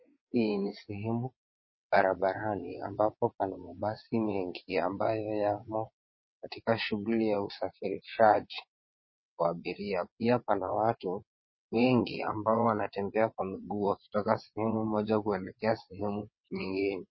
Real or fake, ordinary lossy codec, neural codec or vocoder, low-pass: fake; MP3, 24 kbps; vocoder, 22.05 kHz, 80 mel bands, WaveNeXt; 7.2 kHz